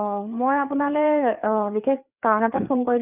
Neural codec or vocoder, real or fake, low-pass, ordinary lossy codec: codec, 16 kHz, 4 kbps, FreqCodec, larger model; fake; 3.6 kHz; none